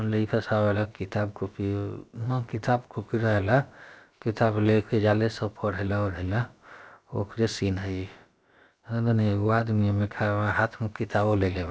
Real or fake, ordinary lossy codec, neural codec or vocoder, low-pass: fake; none; codec, 16 kHz, about 1 kbps, DyCAST, with the encoder's durations; none